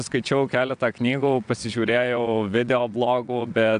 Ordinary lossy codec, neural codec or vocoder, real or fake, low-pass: AAC, 96 kbps; vocoder, 22.05 kHz, 80 mel bands, WaveNeXt; fake; 9.9 kHz